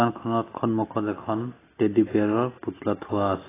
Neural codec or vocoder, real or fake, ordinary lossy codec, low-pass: none; real; AAC, 16 kbps; 3.6 kHz